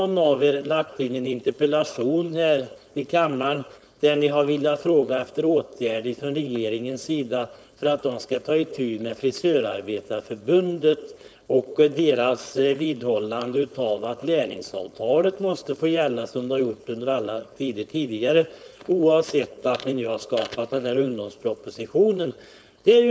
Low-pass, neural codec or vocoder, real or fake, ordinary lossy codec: none; codec, 16 kHz, 4.8 kbps, FACodec; fake; none